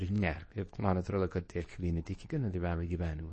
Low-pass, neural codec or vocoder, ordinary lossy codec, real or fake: 10.8 kHz; codec, 24 kHz, 0.9 kbps, WavTokenizer, medium speech release version 2; MP3, 32 kbps; fake